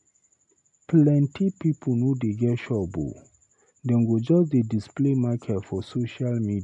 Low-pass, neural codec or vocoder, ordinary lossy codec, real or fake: 10.8 kHz; none; none; real